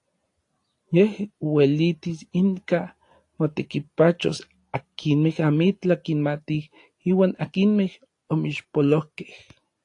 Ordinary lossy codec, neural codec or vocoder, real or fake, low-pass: AAC, 48 kbps; none; real; 10.8 kHz